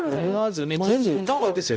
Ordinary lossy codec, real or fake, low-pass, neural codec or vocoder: none; fake; none; codec, 16 kHz, 0.5 kbps, X-Codec, HuBERT features, trained on balanced general audio